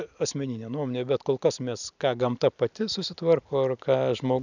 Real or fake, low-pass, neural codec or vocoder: fake; 7.2 kHz; vocoder, 44.1 kHz, 128 mel bands every 256 samples, BigVGAN v2